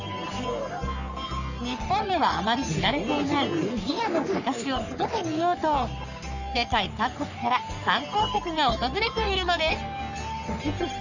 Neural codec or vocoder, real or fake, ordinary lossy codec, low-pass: codec, 44.1 kHz, 3.4 kbps, Pupu-Codec; fake; none; 7.2 kHz